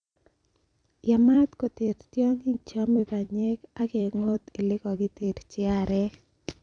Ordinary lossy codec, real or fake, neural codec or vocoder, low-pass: none; fake; vocoder, 44.1 kHz, 128 mel bands every 256 samples, BigVGAN v2; 9.9 kHz